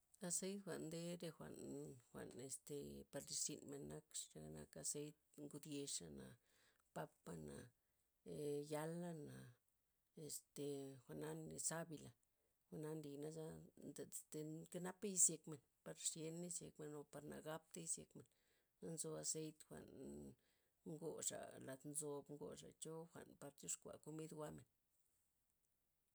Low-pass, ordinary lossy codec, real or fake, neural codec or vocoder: none; none; real; none